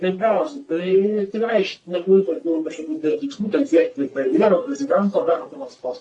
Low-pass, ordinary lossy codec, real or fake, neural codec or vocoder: 10.8 kHz; AAC, 48 kbps; fake; codec, 44.1 kHz, 1.7 kbps, Pupu-Codec